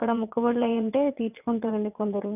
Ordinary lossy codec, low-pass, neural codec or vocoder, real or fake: none; 3.6 kHz; vocoder, 22.05 kHz, 80 mel bands, WaveNeXt; fake